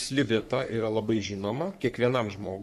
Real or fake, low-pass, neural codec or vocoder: fake; 14.4 kHz; codec, 44.1 kHz, 3.4 kbps, Pupu-Codec